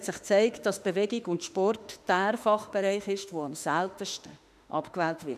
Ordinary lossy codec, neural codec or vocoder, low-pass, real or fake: none; autoencoder, 48 kHz, 32 numbers a frame, DAC-VAE, trained on Japanese speech; 14.4 kHz; fake